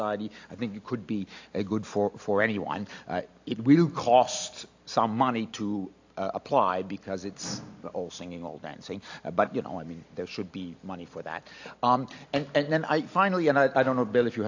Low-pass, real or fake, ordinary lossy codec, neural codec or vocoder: 7.2 kHz; real; AAC, 48 kbps; none